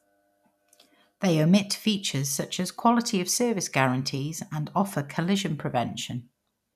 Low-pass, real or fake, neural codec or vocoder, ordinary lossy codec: 14.4 kHz; real; none; none